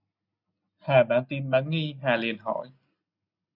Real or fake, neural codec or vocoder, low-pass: real; none; 5.4 kHz